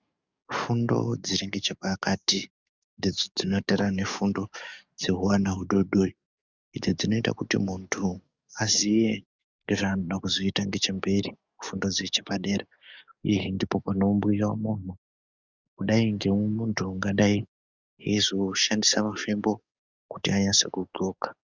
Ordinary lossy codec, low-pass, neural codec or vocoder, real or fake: Opus, 64 kbps; 7.2 kHz; codec, 44.1 kHz, 7.8 kbps, DAC; fake